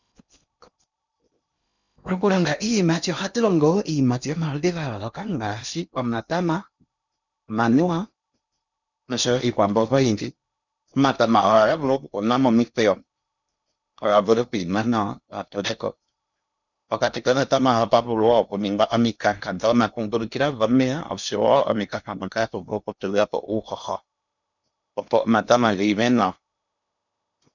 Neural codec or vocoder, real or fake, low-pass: codec, 16 kHz in and 24 kHz out, 0.8 kbps, FocalCodec, streaming, 65536 codes; fake; 7.2 kHz